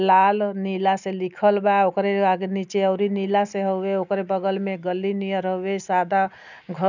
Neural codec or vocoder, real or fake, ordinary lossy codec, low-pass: none; real; none; 7.2 kHz